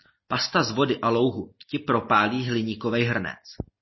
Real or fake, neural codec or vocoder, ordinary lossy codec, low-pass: real; none; MP3, 24 kbps; 7.2 kHz